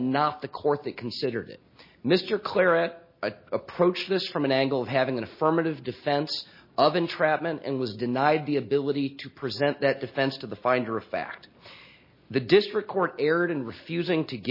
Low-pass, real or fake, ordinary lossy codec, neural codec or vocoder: 5.4 kHz; real; MP3, 24 kbps; none